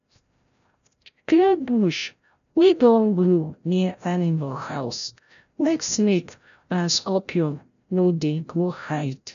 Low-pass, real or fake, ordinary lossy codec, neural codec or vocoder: 7.2 kHz; fake; none; codec, 16 kHz, 0.5 kbps, FreqCodec, larger model